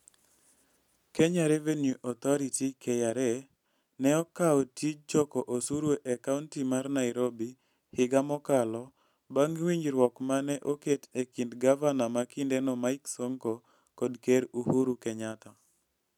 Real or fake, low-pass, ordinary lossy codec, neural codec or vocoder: real; 19.8 kHz; none; none